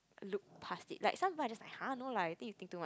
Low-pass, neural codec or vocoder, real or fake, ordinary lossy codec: none; none; real; none